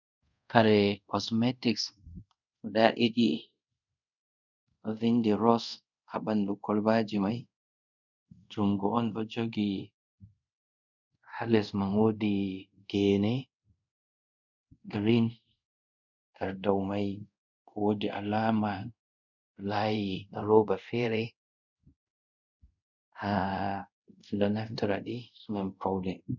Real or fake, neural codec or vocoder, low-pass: fake; codec, 24 kHz, 0.5 kbps, DualCodec; 7.2 kHz